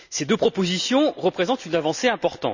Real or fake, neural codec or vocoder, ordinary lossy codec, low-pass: real; none; none; 7.2 kHz